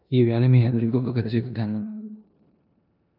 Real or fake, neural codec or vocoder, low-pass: fake; codec, 16 kHz in and 24 kHz out, 0.9 kbps, LongCat-Audio-Codec, four codebook decoder; 5.4 kHz